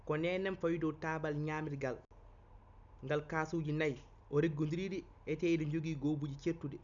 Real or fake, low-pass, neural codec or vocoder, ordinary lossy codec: real; 7.2 kHz; none; none